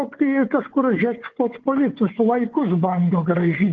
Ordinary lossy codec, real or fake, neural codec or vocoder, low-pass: Opus, 16 kbps; fake; codec, 16 kHz, 4 kbps, FunCodec, trained on Chinese and English, 50 frames a second; 7.2 kHz